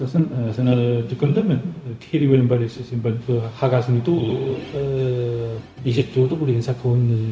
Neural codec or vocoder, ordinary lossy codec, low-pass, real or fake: codec, 16 kHz, 0.4 kbps, LongCat-Audio-Codec; none; none; fake